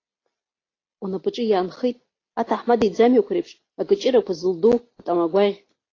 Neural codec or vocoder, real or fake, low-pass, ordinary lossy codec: none; real; 7.2 kHz; AAC, 32 kbps